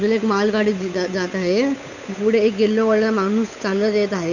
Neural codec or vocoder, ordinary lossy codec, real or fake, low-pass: codec, 16 kHz, 8 kbps, FunCodec, trained on Chinese and English, 25 frames a second; none; fake; 7.2 kHz